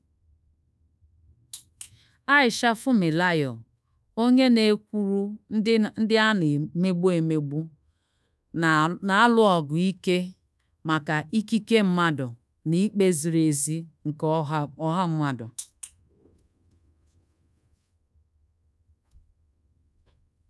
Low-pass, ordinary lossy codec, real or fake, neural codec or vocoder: none; none; fake; codec, 24 kHz, 1.2 kbps, DualCodec